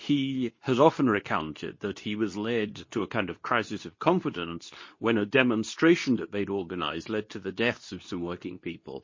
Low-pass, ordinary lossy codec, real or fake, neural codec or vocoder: 7.2 kHz; MP3, 32 kbps; fake; codec, 24 kHz, 0.9 kbps, WavTokenizer, medium speech release version 2